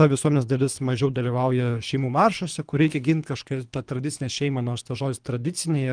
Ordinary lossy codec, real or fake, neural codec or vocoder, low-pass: Opus, 32 kbps; fake; codec, 24 kHz, 3 kbps, HILCodec; 9.9 kHz